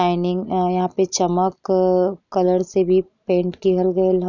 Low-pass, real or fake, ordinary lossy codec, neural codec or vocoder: 7.2 kHz; real; none; none